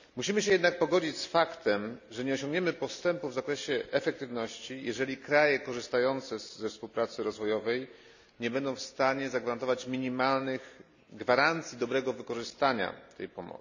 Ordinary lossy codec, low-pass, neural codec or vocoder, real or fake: none; 7.2 kHz; none; real